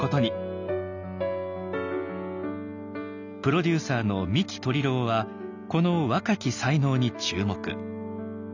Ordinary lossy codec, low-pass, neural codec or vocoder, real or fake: none; 7.2 kHz; none; real